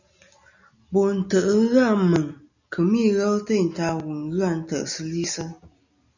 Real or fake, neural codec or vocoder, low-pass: real; none; 7.2 kHz